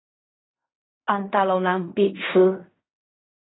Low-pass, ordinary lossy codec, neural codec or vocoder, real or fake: 7.2 kHz; AAC, 16 kbps; codec, 16 kHz in and 24 kHz out, 0.4 kbps, LongCat-Audio-Codec, fine tuned four codebook decoder; fake